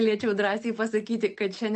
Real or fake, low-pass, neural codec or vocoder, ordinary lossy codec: real; 10.8 kHz; none; MP3, 48 kbps